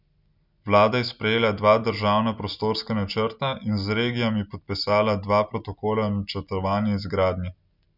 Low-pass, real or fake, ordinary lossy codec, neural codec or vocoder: 5.4 kHz; real; none; none